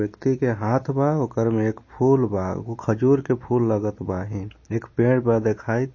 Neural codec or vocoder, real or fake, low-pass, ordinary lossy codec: none; real; 7.2 kHz; MP3, 32 kbps